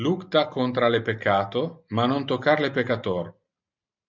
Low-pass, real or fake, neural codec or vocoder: 7.2 kHz; real; none